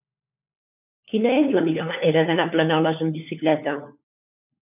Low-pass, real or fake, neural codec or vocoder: 3.6 kHz; fake; codec, 16 kHz, 4 kbps, FunCodec, trained on LibriTTS, 50 frames a second